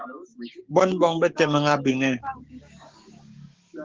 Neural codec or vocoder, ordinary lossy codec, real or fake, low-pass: codec, 16 kHz, 6 kbps, DAC; Opus, 16 kbps; fake; 7.2 kHz